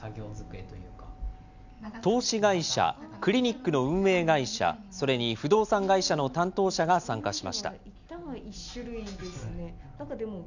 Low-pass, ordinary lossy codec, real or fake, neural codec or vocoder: 7.2 kHz; none; real; none